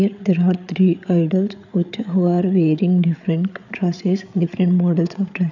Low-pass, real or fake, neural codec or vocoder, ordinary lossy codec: 7.2 kHz; fake; codec, 16 kHz, 8 kbps, FreqCodec, larger model; none